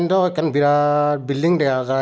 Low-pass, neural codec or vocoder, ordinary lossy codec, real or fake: none; none; none; real